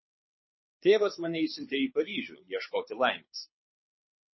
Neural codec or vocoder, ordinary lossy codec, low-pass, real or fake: codec, 24 kHz, 6 kbps, HILCodec; MP3, 24 kbps; 7.2 kHz; fake